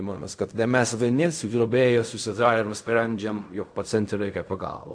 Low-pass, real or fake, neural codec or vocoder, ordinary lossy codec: 9.9 kHz; fake; codec, 16 kHz in and 24 kHz out, 0.4 kbps, LongCat-Audio-Codec, fine tuned four codebook decoder; AAC, 64 kbps